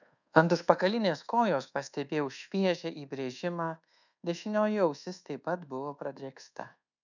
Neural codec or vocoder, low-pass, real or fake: codec, 24 kHz, 1.2 kbps, DualCodec; 7.2 kHz; fake